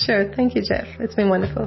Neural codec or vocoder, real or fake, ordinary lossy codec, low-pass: autoencoder, 48 kHz, 128 numbers a frame, DAC-VAE, trained on Japanese speech; fake; MP3, 24 kbps; 7.2 kHz